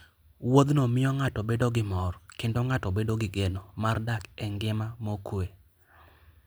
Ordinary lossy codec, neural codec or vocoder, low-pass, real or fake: none; none; none; real